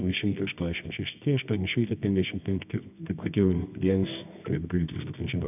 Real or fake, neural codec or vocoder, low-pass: fake; codec, 24 kHz, 0.9 kbps, WavTokenizer, medium music audio release; 3.6 kHz